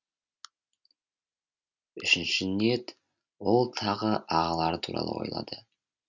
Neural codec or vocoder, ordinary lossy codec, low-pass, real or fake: none; none; 7.2 kHz; real